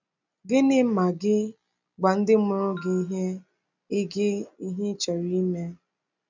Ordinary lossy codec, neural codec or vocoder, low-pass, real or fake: none; none; 7.2 kHz; real